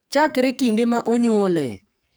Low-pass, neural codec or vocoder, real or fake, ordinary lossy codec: none; codec, 44.1 kHz, 2.6 kbps, SNAC; fake; none